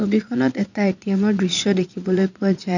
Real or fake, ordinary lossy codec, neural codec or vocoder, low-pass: fake; none; vocoder, 22.05 kHz, 80 mel bands, Vocos; 7.2 kHz